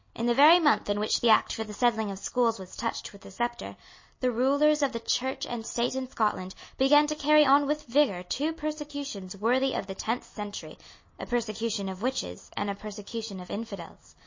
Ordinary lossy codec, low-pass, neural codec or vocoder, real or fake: MP3, 32 kbps; 7.2 kHz; none; real